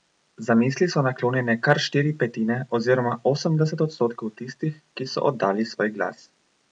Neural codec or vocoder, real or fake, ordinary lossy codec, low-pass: none; real; none; 9.9 kHz